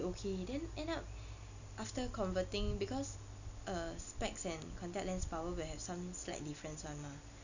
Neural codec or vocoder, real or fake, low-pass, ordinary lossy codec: none; real; 7.2 kHz; none